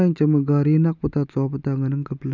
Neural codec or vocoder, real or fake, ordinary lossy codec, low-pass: none; real; none; 7.2 kHz